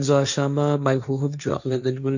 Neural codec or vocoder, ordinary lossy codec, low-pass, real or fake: codec, 16 kHz, 1.1 kbps, Voila-Tokenizer; none; 7.2 kHz; fake